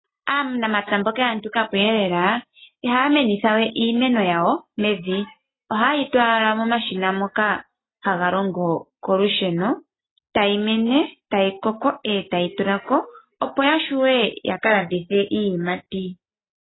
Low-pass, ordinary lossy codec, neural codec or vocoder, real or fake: 7.2 kHz; AAC, 16 kbps; none; real